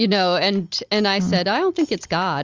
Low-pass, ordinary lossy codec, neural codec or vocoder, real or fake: 7.2 kHz; Opus, 32 kbps; none; real